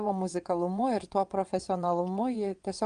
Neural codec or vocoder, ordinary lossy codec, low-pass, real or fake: vocoder, 22.05 kHz, 80 mel bands, WaveNeXt; Opus, 32 kbps; 9.9 kHz; fake